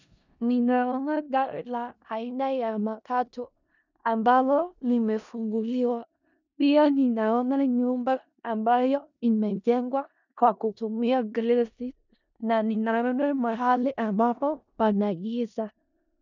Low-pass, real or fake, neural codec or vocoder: 7.2 kHz; fake; codec, 16 kHz in and 24 kHz out, 0.4 kbps, LongCat-Audio-Codec, four codebook decoder